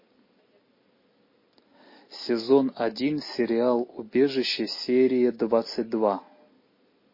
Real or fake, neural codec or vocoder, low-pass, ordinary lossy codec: real; none; 5.4 kHz; MP3, 24 kbps